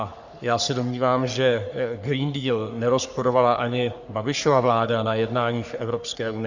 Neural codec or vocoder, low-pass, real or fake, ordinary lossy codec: codec, 44.1 kHz, 3.4 kbps, Pupu-Codec; 7.2 kHz; fake; Opus, 64 kbps